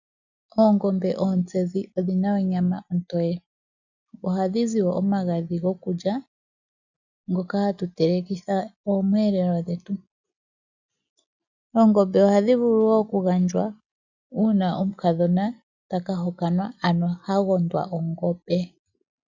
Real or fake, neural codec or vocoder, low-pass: real; none; 7.2 kHz